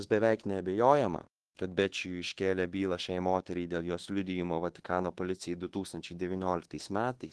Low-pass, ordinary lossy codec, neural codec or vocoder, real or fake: 10.8 kHz; Opus, 16 kbps; codec, 24 kHz, 1.2 kbps, DualCodec; fake